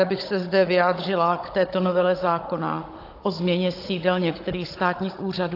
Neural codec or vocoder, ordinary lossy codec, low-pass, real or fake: codec, 16 kHz, 16 kbps, FunCodec, trained on Chinese and English, 50 frames a second; AAC, 32 kbps; 5.4 kHz; fake